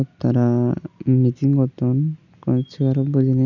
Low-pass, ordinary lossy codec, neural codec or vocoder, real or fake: 7.2 kHz; none; none; real